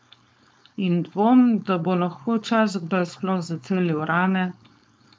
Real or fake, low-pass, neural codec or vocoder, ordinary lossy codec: fake; none; codec, 16 kHz, 4.8 kbps, FACodec; none